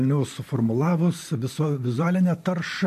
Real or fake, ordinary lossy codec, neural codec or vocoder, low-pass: fake; MP3, 64 kbps; vocoder, 44.1 kHz, 128 mel bands every 512 samples, BigVGAN v2; 14.4 kHz